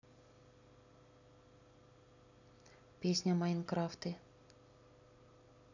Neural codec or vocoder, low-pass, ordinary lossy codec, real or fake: none; 7.2 kHz; none; real